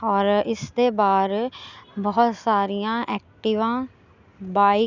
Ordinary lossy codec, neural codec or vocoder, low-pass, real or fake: none; none; 7.2 kHz; real